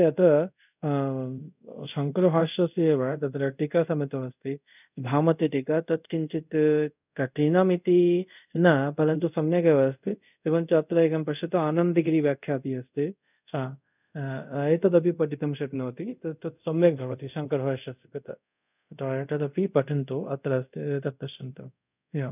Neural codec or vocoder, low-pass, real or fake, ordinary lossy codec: codec, 24 kHz, 0.5 kbps, DualCodec; 3.6 kHz; fake; none